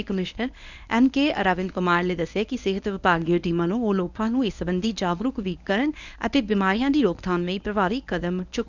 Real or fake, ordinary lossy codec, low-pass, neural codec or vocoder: fake; none; 7.2 kHz; codec, 24 kHz, 0.9 kbps, WavTokenizer, medium speech release version 1